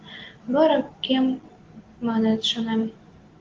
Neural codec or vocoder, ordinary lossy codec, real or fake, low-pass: none; Opus, 16 kbps; real; 7.2 kHz